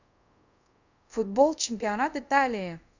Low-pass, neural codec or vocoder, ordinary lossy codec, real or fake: 7.2 kHz; codec, 24 kHz, 0.5 kbps, DualCodec; none; fake